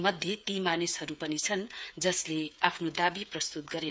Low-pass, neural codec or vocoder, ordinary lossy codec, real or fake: none; codec, 16 kHz, 8 kbps, FreqCodec, smaller model; none; fake